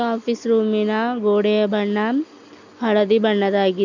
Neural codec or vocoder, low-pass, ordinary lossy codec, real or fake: none; 7.2 kHz; none; real